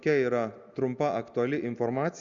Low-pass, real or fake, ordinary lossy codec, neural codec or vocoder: 7.2 kHz; real; Opus, 64 kbps; none